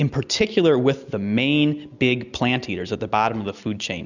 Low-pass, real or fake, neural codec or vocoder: 7.2 kHz; real; none